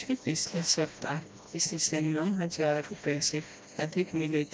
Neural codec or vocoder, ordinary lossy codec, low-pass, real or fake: codec, 16 kHz, 1 kbps, FreqCodec, smaller model; none; none; fake